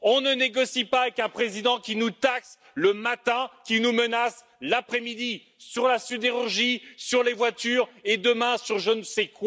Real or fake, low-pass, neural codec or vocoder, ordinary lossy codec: real; none; none; none